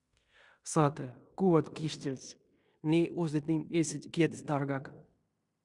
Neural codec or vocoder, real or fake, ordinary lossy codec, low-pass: codec, 16 kHz in and 24 kHz out, 0.9 kbps, LongCat-Audio-Codec, fine tuned four codebook decoder; fake; Opus, 64 kbps; 10.8 kHz